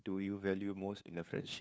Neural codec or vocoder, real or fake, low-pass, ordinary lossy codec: codec, 16 kHz, 2 kbps, FunCodec, trained on LibriTTS, 25 frames a second; fake; none; none